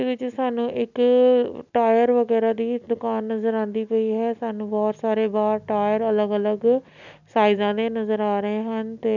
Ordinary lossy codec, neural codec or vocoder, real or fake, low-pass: none; none; real; 7.2 kHz